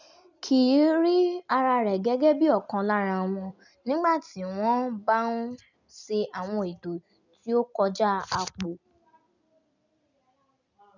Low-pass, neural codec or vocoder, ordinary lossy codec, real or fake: 7.2 kHz; none; none; real